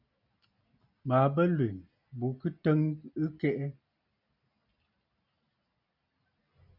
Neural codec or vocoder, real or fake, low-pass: none; real; 5.4 kHz